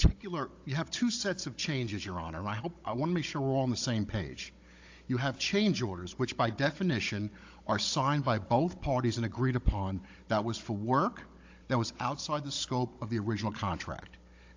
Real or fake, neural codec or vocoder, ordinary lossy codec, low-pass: fake; codec, 16 kHz, 16 kbps, FunCodec, trained on Chinese and English, 50 frames a second; AAC, 48 kbps; 7.2 kHz